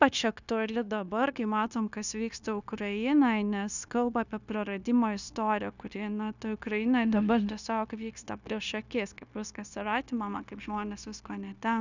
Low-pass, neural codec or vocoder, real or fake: 7.2 kHz; codec, 16 kHz, 0.9 kbps, LongCat-Audio-Codec; fake